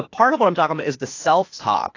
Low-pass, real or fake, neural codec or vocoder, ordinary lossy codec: 7.2 kHz; fake; codec, 16 kHz, 0.8 kbps, ZipCodec; AAC, 48 kbps